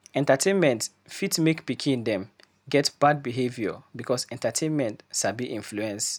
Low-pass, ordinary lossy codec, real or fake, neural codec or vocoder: none; none; real; none